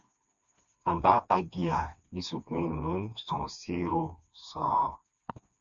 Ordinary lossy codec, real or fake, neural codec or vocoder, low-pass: Opus, 64 kbps; fake; codec, 16 kHz, 2 kbps, FreqCodec, smaller model; 7.2 kHz